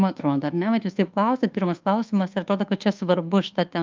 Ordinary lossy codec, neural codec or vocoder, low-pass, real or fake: Opus, 32 kbps; codec, 24 kHz, 1.2 kbps, DualCodec; 7.2 kHz; fake